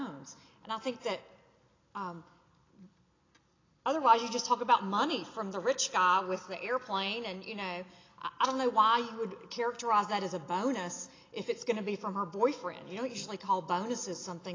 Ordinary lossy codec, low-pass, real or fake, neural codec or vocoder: AAC, 32 kbps; 7.2 kHz; real; none